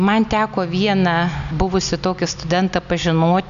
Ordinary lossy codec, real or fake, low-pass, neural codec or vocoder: MP3, 96 kbps; real; 7.2 kHz; none